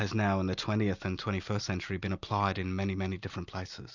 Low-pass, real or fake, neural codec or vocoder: 7.2 kHz; real; none